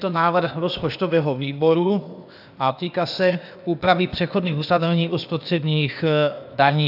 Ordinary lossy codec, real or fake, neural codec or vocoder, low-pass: AAC, 48 kbps; fake; codec, 16 kHz, 0.8 kbps, ZipCodec; 5.4 kHz